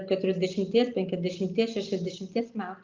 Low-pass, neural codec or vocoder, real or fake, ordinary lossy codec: 7.2 kHz; none; real; Opus, 32 kbps